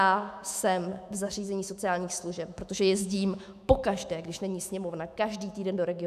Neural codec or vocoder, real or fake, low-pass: autoencoder, 48 kHz, 128 numbers a frame, DAC-VAE, trained on Japanese speech; fake; 14.4 kHz